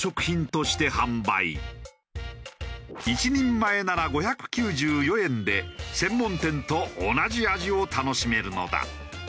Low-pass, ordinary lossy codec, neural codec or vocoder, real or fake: none; none; none; real